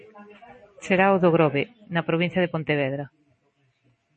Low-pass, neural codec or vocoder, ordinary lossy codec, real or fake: 10.8 kHz; none; MP3, 32 kbps; real